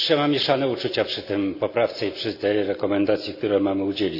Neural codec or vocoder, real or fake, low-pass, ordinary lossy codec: none; real; 5.4 kHz; none